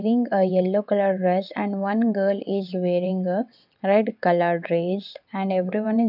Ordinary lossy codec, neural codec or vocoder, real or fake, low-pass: none; vocoder, 22.05 kHz, 80 mel bands, WaveNeXt; fake; 5.4 kHz